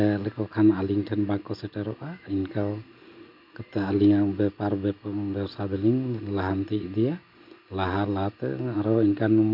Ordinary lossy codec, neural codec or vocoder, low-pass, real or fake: MP3, 48 kbps; none; 5.4 kHz; real